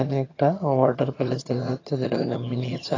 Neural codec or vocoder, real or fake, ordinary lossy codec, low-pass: vocoder, 22.05 kHz, 80 mel bands, HiFi-GAN; fake; AAC, 32 kbps; 7.2 kHz